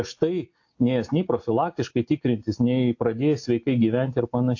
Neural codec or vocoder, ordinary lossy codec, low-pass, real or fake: none; AAC, 48 kbps; 7.2 kHz; real